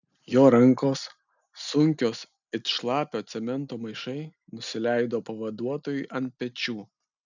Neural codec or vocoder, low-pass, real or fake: none; 7.2 kHz; real